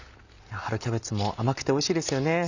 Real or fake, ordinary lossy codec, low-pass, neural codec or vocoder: real; none; 7.2 kHz; none